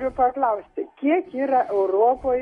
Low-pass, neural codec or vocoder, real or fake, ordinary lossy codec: 10.8 kHz; none; real; AAC, 32 kbps